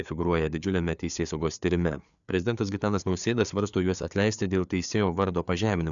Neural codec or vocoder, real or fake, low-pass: codec, 16 kHz, 4 kbps, FreqCodec, larger model; fake; 7.2 kHz